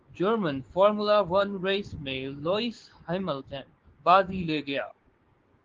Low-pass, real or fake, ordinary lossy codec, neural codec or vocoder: 7.2 kHz; fake; Opus, 16 kbps; codec, 16 kHz, 6 kbps, DAC